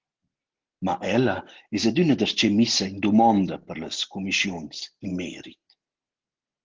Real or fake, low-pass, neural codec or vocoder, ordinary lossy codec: real; 7.2 kHz; none; Opus, 16 kbps